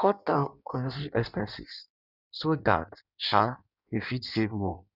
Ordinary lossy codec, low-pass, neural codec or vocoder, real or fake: none; 5.4 kHz; codec, 16 kHz in and 24 kHz out, 1.1 kbps, FireRedTTS-2 codec; fake